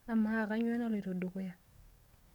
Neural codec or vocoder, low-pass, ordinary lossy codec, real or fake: codec, 44.1 kHz, 7.8 kbps, DAC; 19.8 kHz; none; fake